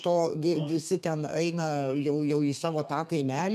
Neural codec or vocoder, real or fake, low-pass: codec, 32 kHz, 1.9 kbps, SNAC; fake; 14.4 kHz